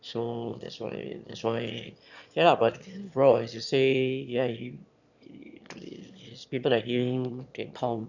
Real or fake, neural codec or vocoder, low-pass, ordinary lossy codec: fake; autoencoder, 22.05 kHz, a latent of 192 numbers a frame, VITS, trained on one speaker; 7.2 kHz; none